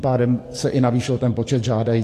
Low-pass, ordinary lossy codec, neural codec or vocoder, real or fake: 14.4 kHz; AAC, 48 kbps; codec, 44.1 kHz, 7.8 kbps, DAC; fake